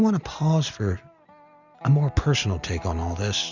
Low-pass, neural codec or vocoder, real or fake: 7.2 kHz; none; real